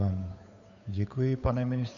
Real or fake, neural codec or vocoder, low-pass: fake; codec, 16 kHz, 8 kbps, FunCodec, trained on Chinese and English, 25 frames a second; 7.2 kHz